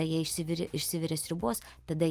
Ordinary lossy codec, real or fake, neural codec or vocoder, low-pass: Opus, 32 kbps; real; none; 14.4 kHz